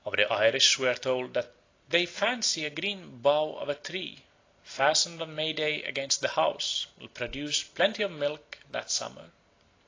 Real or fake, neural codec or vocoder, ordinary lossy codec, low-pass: real; none; AAC, 32 kbps; 7.2 kHz